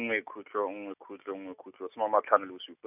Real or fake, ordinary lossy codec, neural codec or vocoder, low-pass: real; none; none; 3.6 kHz